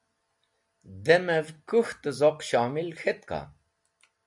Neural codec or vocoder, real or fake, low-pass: none; real; 10.8 kHz